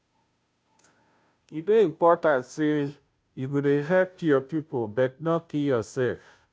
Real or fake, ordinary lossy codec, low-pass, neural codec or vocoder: fake; none; none; codec, 16 kHz, 0.5 kbps, FunCodec, trained on Chinese and English, 25 frames a second